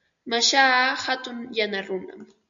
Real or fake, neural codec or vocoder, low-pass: real; none; 7.2 kHz